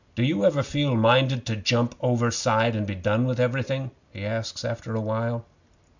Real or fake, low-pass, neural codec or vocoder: real; 7.2 kHz; none